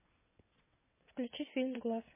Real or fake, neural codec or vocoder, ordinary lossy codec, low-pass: fake; vocoder, 22.05 kHz, 80 mel bands, WaveNeXt; MP3, 16 kbps; 3.6 kHz